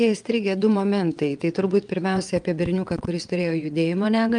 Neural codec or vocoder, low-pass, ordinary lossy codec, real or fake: vocoder, 22.05 kHz, 80 mel bands, WaveNeXt; 9.9 kHz; Opus, 32 kbps; fake